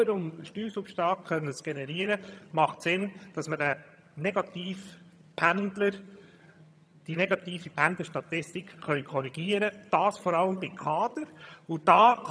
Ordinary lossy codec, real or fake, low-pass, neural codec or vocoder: none; fake; none; vocoder, 22.05 kHz, 80 mel bands, HiFi-GAN